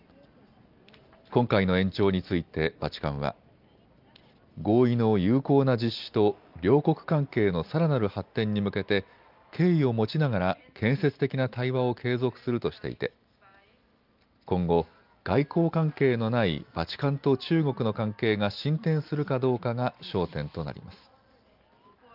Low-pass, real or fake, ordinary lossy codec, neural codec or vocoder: 5.4 kHz; real; Opus, 24 kbps; none